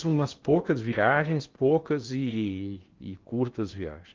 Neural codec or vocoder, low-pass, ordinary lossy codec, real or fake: codec, 16 kHz in and 24 kHz out, 0.8 kbps, FocalCodec, streaming, 65536 codes; 7.2 kHz; Opus, 24 kbps; fake